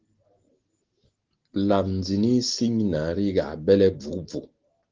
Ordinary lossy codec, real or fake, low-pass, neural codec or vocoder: Opus, 16 kbps; real; 7.2 kHz; none